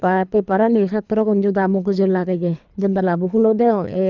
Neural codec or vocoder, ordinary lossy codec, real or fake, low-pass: codec, 24 kHz, 3 kbps, HILCodec; none; fake; 7.2 kHz